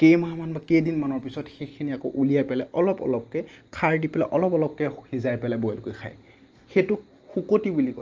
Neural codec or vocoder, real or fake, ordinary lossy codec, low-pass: none; real; Opus, 24 kbps; 7.2 kHz